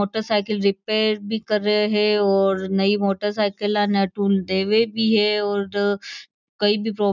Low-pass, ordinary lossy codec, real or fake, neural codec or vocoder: 7.2 kHz; none; real; none